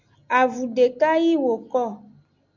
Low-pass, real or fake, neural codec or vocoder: 7.2 kHz; real; none